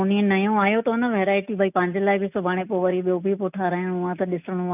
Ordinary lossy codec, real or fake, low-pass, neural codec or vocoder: none; real; 3.6 kHz; none